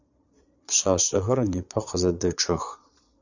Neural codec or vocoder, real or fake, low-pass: vocoder, 22.05 kHz, 80 mel bands, Vocos; fake; 7.2 kHz